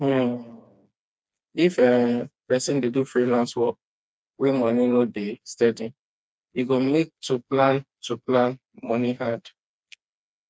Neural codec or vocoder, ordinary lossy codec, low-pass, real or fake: codec, 16 kHz, 2 kbps, FreqCodec, smaller model; none; none; fake